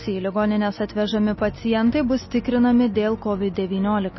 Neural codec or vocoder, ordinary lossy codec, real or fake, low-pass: none; MP3, 24 kbps; real; 7.2 kHz